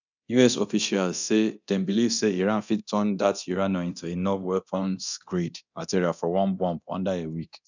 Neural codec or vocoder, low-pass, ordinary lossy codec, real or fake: codec, 24 kHz, 0.9 kbps, DualCodec; 7.2 kHz; none; fake